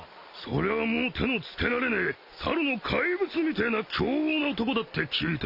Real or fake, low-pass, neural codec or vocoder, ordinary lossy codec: real; 5.4 kHz; none; none